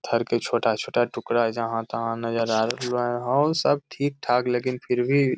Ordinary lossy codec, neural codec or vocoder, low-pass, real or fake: none; none; none; real